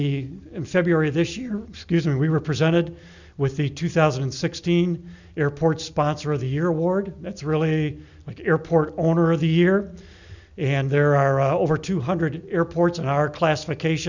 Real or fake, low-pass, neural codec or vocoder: real; 7.2 kHz; none